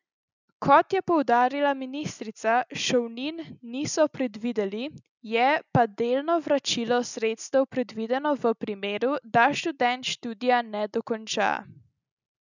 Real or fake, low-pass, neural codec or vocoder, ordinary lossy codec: real; 7.2 kHz; none; none